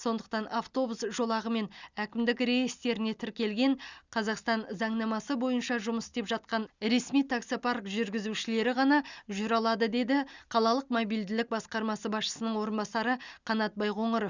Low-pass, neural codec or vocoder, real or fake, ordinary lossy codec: 7.2 kHz; none; real; Opus, 64 kbps